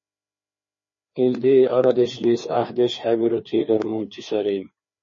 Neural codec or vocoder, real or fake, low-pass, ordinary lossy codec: codec, 16 kHz, 2 kbps, FreqCodec, larger model; fake; 7.2 kHz; MP3, 32 kbps